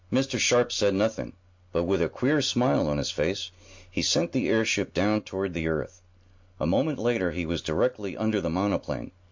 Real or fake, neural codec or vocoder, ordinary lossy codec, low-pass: real; none; MP3, 48 kbps; 7.2 kHz